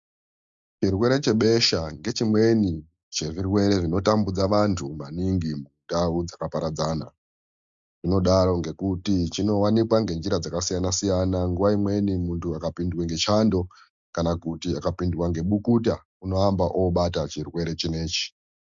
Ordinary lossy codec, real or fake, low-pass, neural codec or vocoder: MP3, 64 kbps; real; 7.2 kHz; none